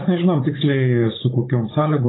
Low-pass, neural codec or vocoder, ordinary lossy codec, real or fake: 7.2 kHz; codec, 16 kHz, 16 kbps, FunCodec, trained on Chinese and English, 50 frames a second; AAC, 16 kbps; fake